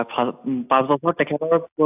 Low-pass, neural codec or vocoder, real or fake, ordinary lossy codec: 3.6 kHz; none; real; AAC, 32 kbps